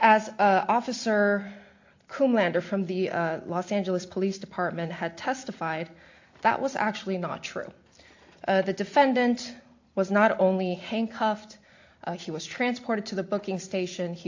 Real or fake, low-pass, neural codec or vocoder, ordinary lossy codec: real; 7.2 kHz; none; AAC, 48 kbps